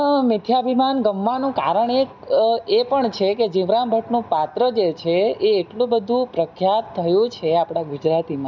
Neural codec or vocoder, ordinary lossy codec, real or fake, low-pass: none; none; real; 7.2 kHz